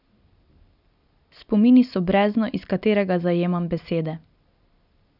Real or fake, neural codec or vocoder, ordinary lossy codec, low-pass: real; none; none; 5.4 kHz